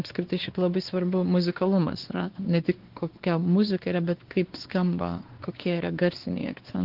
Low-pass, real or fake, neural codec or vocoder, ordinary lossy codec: 5.4 kHz; fake; codec, 16 kHz, 2 kbps, X-Codec, WavLM features, trained on Multilingual LibriSpeech; Opus, 16 kbps